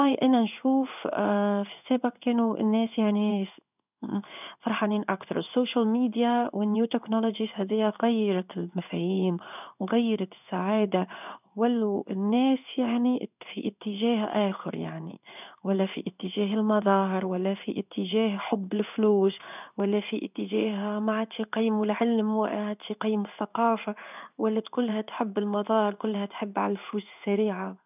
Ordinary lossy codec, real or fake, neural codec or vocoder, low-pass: none; fake; codec, 16 kHz in and 24 kHz out, 1 kbps, XY-Tokenizer; 3.6 kHz